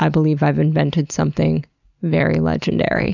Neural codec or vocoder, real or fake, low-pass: none; real; 7.2 kHz